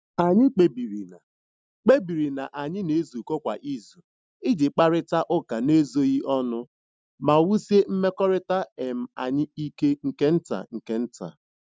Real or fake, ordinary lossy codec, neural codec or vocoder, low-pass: real; none; none; none